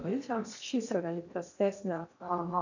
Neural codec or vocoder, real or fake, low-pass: codec, 16 kHz in and 24 kHz out, 0.8 kbps, FocalCodec, streaming, 65536 codes; fake; 7.2 kHz